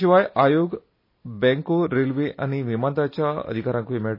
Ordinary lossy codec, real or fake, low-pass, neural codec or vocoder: MP3, 24 kbps; real; 5.4 kHz; none